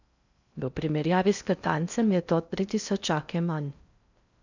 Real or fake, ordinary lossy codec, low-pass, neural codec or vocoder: fake; none; 7.2 kHz; codec, 16 kHz in and 24 kHz out, 0.6 kbps, FocalCodec, streaming, 2048 codes